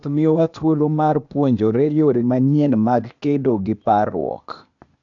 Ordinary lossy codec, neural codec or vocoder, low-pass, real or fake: none; codec, 16 kHz, 0.8 kbps, ZipCodec; 7.2 kHz; fake